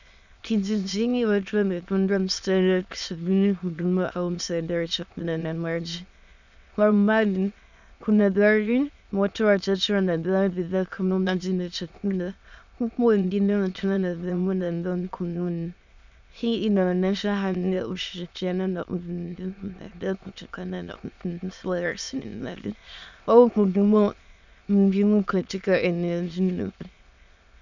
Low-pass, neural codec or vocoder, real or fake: 7.2 kHz; autoencoder, 22.05 kHz, a latent of 192 numbers a frame, VITS, trained on many speakers; fake